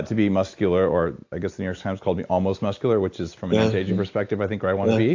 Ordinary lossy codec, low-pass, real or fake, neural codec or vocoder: AAC, 48 kbps; 7.2 kHz; real; none